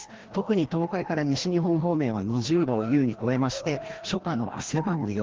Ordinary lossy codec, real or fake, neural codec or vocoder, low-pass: Opus, 16 kbps; fake; codec, 16 kHz, 1 kbps, FreqCodec, larger model; 7.2 kHz